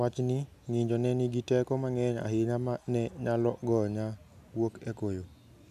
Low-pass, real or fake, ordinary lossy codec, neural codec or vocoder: 14.4 kHz; real; none; none